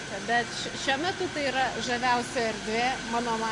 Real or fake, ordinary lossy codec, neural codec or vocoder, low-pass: real; AAC, 48 kbps; none; 10.8 kHz